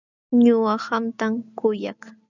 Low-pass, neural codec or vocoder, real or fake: 7.2 kHz; none; real